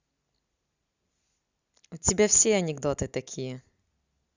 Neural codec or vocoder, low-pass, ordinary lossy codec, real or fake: none; 7.2 kHz; Opus, 64 kbps; real